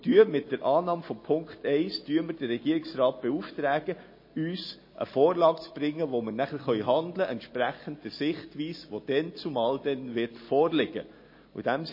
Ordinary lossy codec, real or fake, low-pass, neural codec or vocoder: MP3, 24 kbps; real; 5.4 kHz; none